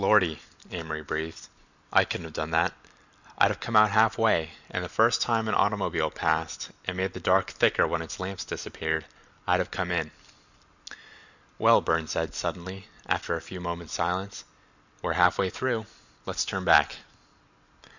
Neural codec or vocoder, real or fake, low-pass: none; real; 7.2 kHz